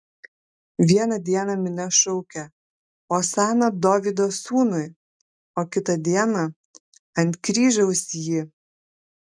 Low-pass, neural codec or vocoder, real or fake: 9.9 kHz; none; real